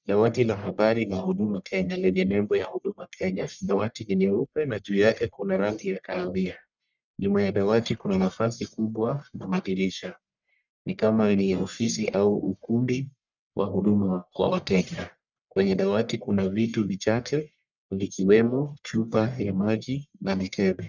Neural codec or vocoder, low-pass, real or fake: codec, 44.1 kHz, 1.7 kbps, Pupu-Codec; 7.2 kHz; fake